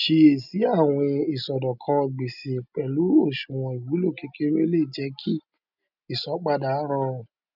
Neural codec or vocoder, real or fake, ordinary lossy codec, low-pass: none; real; none; 5.4 kHz